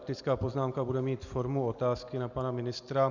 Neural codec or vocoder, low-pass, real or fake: none; 7.2 kHz; real